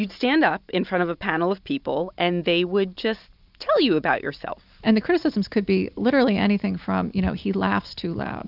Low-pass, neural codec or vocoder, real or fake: 5.4 kHz; none; real